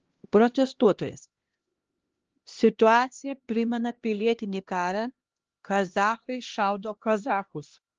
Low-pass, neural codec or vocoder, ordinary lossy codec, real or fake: 7.2 kHz; codec, 16 kHz, 1 kbps, X-Codec, HuBERT features, trained on LibriSpeech; Opus, 16 kbps; fake